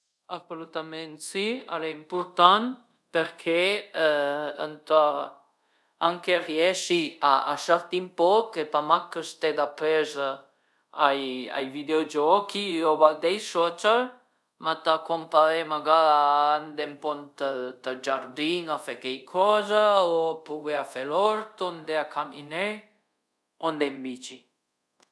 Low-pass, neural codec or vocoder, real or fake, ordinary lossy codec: none; codec, 24 kHz, 0.5 kbps, DualCodec; fake; none